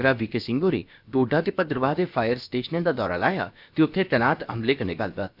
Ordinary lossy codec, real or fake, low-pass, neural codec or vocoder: none; fake; 5.4 kHz; codec, 16 kHz, about 1 kbps, DyCAST, with the encoder's durations